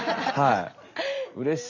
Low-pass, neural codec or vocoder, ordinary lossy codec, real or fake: 7.2 kHz; none; none; real